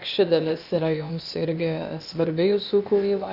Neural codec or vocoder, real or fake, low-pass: codec, 16 kHz, 0.8 kbps, ZipCodec; fake; 5.4 kHz